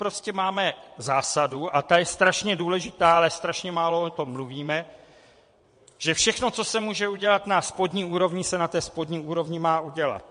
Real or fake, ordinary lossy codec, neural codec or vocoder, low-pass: fake; MP3, 48 kbps; vocoder, 22.05 kHz, 80 mel bands, WaveNeXt; 9.9 kHz